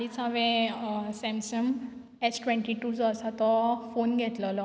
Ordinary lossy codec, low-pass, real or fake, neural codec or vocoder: none; none; real; none